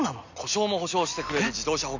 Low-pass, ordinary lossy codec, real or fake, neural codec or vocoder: 7.2 kHz; none; real; none